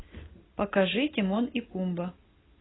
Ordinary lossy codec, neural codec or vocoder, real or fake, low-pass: AAC, 16 kbps; none; real; 7.2 kHz